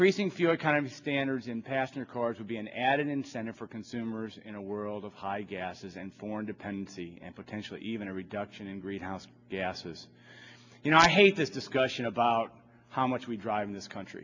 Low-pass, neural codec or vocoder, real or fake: 7.2 kHz; none; real